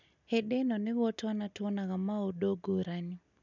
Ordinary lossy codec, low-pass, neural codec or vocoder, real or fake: none; 7.2 kHz; none; real